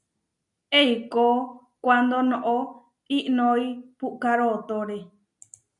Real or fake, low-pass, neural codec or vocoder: real; 10.8 kHz; none